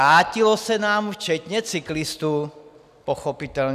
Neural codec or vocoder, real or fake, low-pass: none; real; 14.4 kHz